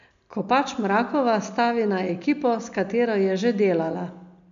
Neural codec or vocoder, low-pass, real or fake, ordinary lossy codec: none; 7.2 kHz; real; MP3, 64 kbps